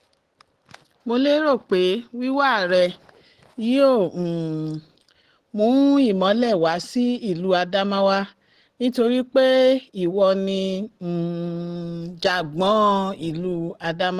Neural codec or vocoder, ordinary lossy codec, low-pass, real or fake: none; Opus, 16 kbps; 14.4 kHz; real